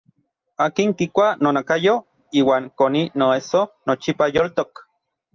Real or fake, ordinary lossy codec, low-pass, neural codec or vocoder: real; Opus, 24 kbps; 7.2 kHz; none